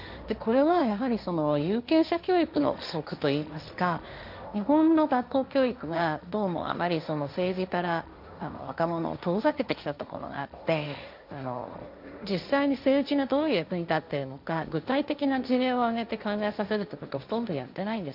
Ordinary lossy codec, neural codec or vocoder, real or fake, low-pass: none; codec, 16 kHz, 1.1 kbps, Voila-Tokenizer; fake; 5.4 kHz